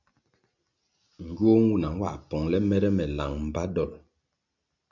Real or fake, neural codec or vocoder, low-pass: real; none; 7.2 kHz